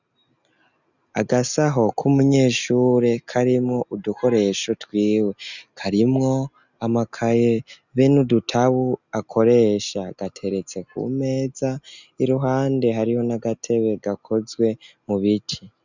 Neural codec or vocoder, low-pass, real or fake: none; 7.2 kHz; real